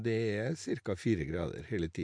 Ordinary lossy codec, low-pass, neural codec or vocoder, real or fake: MP3, 64 kbps; 9.9 kHz; none; real